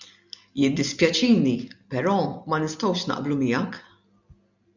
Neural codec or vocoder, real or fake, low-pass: none; real; 7.2 kHz